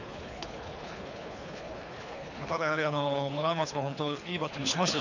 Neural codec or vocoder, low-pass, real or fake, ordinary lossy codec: codec, 24 kHz, 3 kbps, HILCodec; 7.2 kHz; fake; none